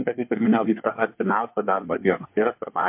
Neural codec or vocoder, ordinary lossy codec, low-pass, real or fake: codec, 24 kHz, 1 kbps, SNAC; MP3, 32 kbps; 3.6 kHz; fake